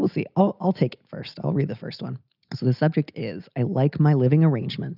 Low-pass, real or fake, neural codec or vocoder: 5.4 kHz; real; none